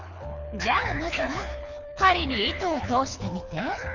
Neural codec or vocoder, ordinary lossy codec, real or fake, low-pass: codec, 24 kHz, 6 kbps, HILCodec; none; fake; 7.2 kHz